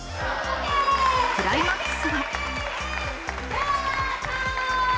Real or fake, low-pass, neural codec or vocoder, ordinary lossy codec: real; none; none; none